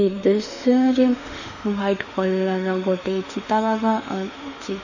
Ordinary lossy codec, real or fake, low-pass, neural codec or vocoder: none; fake; 7.2 kHz; autoencoder, 48 kHz, 32 numbers a frame, DAC-VAE, trained on Japanese speech